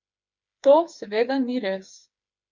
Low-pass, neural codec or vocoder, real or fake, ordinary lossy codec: 7.2 kHz; codec, 16 kHz, 4 kbps, FreqCodec, smaller model; fake; Opus, 64 kbps